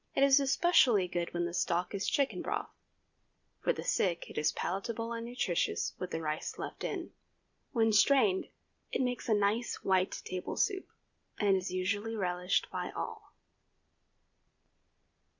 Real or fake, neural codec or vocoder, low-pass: real; none; 7.2 kHz